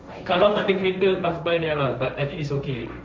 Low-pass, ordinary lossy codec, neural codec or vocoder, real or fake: none; none; codec, 16 kHz, 1.1 kbps, Voila-Tokenizer; fake